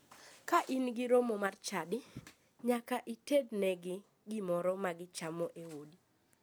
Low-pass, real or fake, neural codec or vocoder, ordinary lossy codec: none; real; none; none